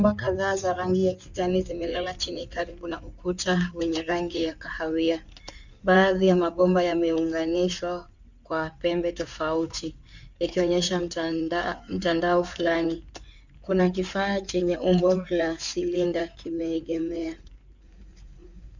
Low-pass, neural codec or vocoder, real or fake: 7.2 kHz; codec, 16 kHz in and 24 kHz out, 2.2 kbps, FireRedTTS-2 codec; fake